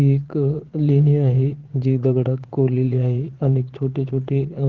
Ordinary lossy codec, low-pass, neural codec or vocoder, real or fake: Opus, 16 kbps; 7.2 kHz; vocoder, 22.05 kHz, 80 mel bands, Vocos; fake